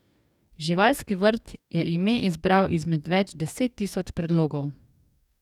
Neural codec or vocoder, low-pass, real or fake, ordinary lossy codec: codec, 44.1 kHz, 2.6 kbps, DAC; 19.8 kHz; fake; none